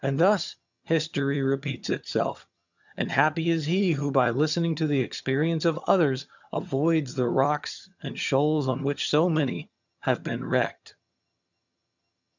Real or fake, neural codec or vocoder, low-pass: fake; vocoder, 22.05 kHz, 80 mel bands, HiFi-GAN; 7.2 kHz